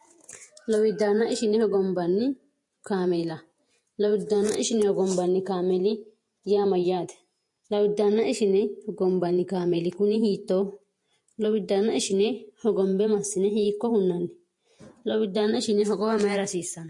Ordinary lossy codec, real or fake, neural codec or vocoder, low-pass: MP3, 48 kbps; fake; vocoder, 48 kHz, 128 mel bands, Vocos; 10.8 kHz